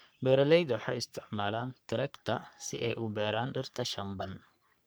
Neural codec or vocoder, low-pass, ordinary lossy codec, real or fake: codec, 44.1 kHz, 3.4 kbps, Pupu-Codec; none; none; fake